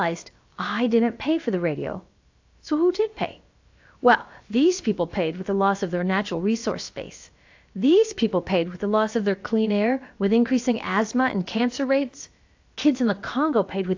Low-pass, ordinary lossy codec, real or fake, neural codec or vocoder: 7.2 kHz; AAC, 48 kbps; fake; codec, 16 kHz, about 1 kbps, DyCAST, with the encoder's durations